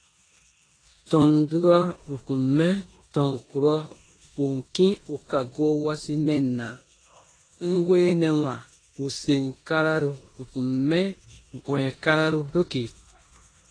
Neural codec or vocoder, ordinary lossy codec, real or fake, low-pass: codec, 16 kHz in and 24 kHz out, 0.9 kbps, LongCat-Audio-Codec, four codebook decoder; AAC, 32 kbps; fake; 9.9 kHz